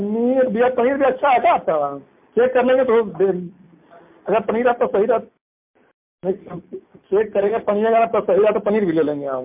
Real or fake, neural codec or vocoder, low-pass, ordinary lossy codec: real; none; 3.6 kHz; MP3, 32 kbps